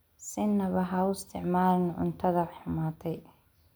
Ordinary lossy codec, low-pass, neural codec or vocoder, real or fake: none; none; none; real